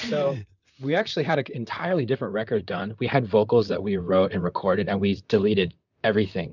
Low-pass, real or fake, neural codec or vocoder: 7.2 kHz; fake; vocoder, 44.1 kHz, 128 mel bands, Pupu-Vocoder